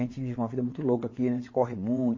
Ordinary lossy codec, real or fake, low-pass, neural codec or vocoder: MP3, 32 kbps; fake; 7.2 kHz; vocoder, 22.05 kHz, 80 mel bands, WaveNeXt